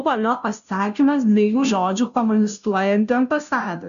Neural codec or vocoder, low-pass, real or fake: codec, 16 kHz, 0.5 kbps, FunCodec, trained on Chinese and English, 25 frames a second; 7.2 kHz; fake